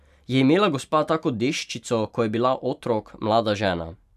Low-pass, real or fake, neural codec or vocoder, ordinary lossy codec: 14.4 kHz; fake; vocoder, 44.1 kHz, 128 mel bands every 512 samples, BigVGAN v2; none